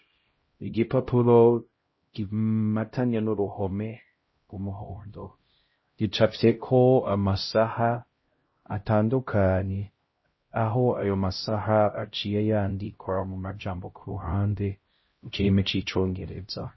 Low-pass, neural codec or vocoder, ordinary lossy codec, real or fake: 7.2 kHz; codec, 16 kHz, 0.5 kbps, X-Codec, HuBERT features, trained on LibriSpeech; MP3, 24 kbps; fake